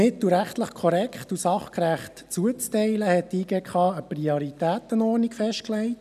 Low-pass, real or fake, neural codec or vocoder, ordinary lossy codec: 14.4 kHz; real; none; none